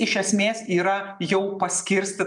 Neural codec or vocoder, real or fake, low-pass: none; real; 10.8 kHz